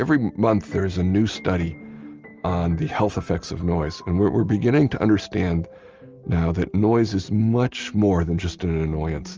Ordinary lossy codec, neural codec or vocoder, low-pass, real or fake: Opus, 24 kbps; none; 7.2 kHz; real